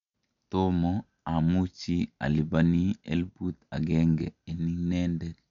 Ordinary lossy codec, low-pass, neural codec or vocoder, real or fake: none; 7.2 kHz; none; real